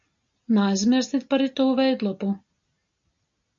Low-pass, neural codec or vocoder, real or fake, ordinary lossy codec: 7.2 kHz; none; real; MP3, 48 kbps